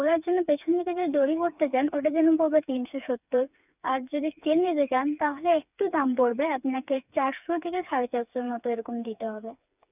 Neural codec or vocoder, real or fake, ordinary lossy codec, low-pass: codec, 16 kHz, 4 kbps, FreqCodec, smaller model; fake; none; 3.6 kHz